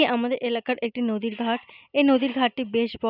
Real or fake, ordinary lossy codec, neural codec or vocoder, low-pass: real; none; none; 5.4 kHz